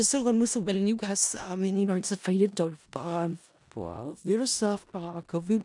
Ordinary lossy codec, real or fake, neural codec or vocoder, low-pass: MP3, 96 kbps; fake; codec, 16 kHz in and 24 kHz out, 0.4 kbps, LongCat-Audio-Codec, four codebook decoder; 10.8 kHz